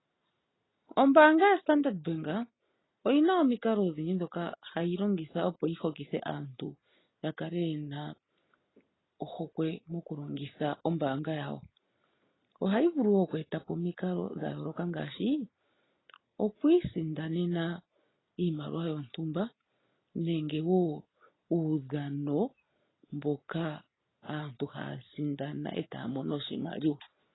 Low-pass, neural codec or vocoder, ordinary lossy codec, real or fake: 7.2 kHz; none; AAC, 16 kbps; real